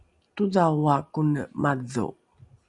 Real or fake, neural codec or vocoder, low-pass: real; none; 10.8 kHz